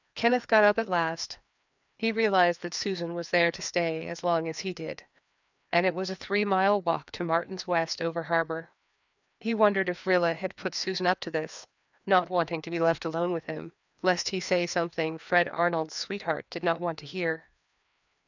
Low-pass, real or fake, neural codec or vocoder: 7.2 kHz; fake; codec, 16 kHz, 2 kbps, FreqCodec, larger model